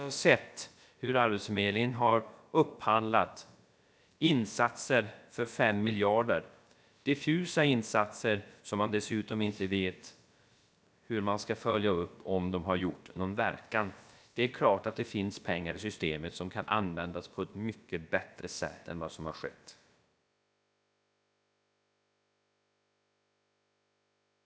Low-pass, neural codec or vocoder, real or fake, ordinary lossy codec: none; codec, 16 kHz, about 1 kbps, DyCAST, with the encoder's durations; fake; none